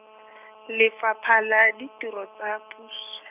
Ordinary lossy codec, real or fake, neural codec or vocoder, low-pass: none; real; none; 3.6 kHz